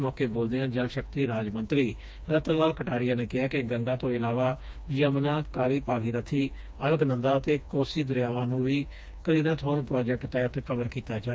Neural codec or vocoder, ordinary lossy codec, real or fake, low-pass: codec, 16 kHz, 2 kbps, FreqCodec, smaller model; none; fake; none